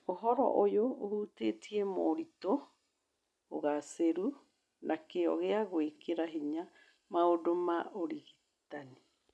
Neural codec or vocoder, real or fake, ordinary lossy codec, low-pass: none; real; none; none